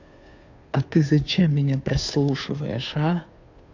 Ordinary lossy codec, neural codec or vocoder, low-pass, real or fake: AAC, 48 kbps; codec, 16 kHz, 2 kbps, FunCodec, trained on Chinese and English, 25 frames a second; 7.2 kHz; fake